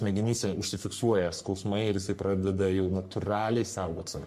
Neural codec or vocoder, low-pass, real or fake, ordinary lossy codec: codec, 44.1 kHz, 3.4 kbps, Pupu-Codec; 14.4 kHz; fake; MP3, 64 kbps